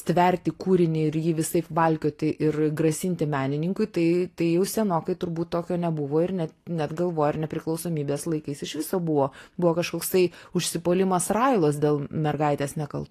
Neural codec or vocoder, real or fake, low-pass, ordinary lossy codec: none; real; 14.4 kHz; AAC, 48 kbps